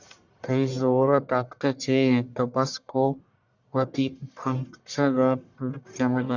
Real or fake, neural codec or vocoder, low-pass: fake; codec, 44.1 kHz, 1.7 kbps, Pupu-Codec; 7.2 kHz